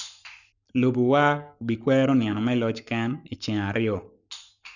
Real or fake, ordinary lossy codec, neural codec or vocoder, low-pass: fake; none; codec, 44.1 kHz, 7.8 kbps, Pupu-Codec; 7.2 kHz